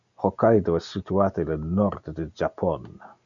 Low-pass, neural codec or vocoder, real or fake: 7.2 kHz; none; real